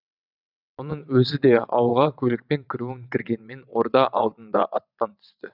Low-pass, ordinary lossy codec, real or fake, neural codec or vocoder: 5.4 kHz; none; fake; vocoder, 22.05 kHz, 80 mel bands, WaveNeXt